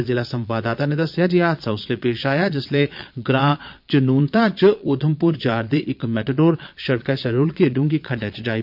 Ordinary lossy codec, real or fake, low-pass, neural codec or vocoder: MP3, 48 kbps; fake; 5.4 kHz; vocoder, 22.05 kHz, 80 mel bands, Vocos